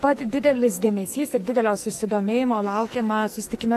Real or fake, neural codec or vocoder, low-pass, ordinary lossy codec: fake; codec, 44.1 kHz, 2.6 kbps, SNAC; 14.4 kHz; AAC, 64 kbps